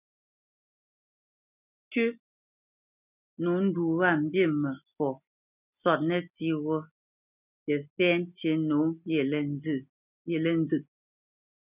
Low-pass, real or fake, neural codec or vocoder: 3.6 kHz; real; none